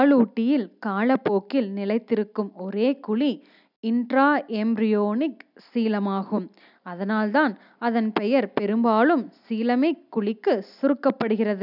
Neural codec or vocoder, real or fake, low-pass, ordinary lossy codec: none; real; 5.4 kHz; none